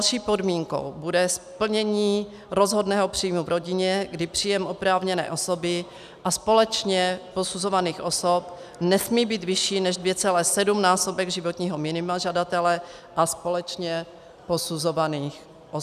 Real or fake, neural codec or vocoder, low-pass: real; none; 14.4 kHz